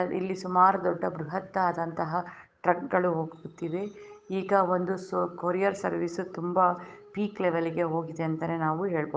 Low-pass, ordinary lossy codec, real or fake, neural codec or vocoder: none; none; fake; codec, 16 kHz, 8 kbps, FunCodec, trained on Chinese and English, 25 frames a second